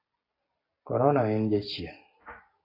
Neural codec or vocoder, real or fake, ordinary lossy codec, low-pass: none; real; AAC, 24 kbps; 5.4 kHz